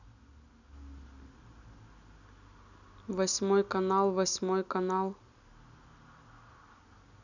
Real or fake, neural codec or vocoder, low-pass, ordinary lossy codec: real; none; 7.2 kHz; none